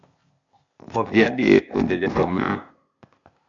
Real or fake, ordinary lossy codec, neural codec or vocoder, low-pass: fake; AAC, 64 kbps; codec, 16 kHz, 0.8 kbps, ZipCodec; 7.2 kHz